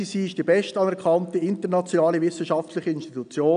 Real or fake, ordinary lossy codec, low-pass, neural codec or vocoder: real; none; 9.9 kHz; none